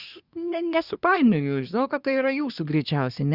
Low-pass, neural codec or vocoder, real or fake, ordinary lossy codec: 5.4 kHz; codec, 24 kHz, 1 kbps, SNAC; fake; Opus, 64 kbps